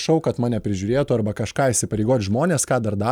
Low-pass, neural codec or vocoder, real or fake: 19.8 kHz; none; real